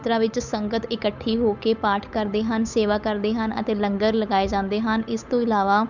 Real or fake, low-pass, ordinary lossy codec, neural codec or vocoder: fake; 7.2 kHz; none; codec, 16 kHz, 8 kbps, FunCodec, trained on Chinese and English, 25 frames a second